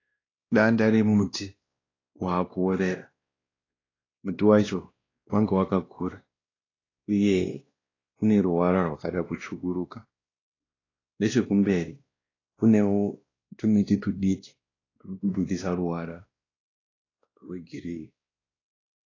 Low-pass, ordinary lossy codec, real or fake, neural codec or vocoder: 7.2 kHz; AAC, 32 kbps; fake; codec, 16 kHz, 1 kbps, X-Codec, WavLM features, trained on Multilingual LibriSpeech